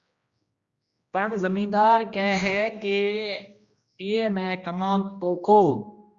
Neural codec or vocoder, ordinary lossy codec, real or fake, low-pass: codec, 16 kHz, 1 kbps, X-Codec, HuBERT features, trained on general audio; Opus, 64 kbps; fake; 7.2 kHz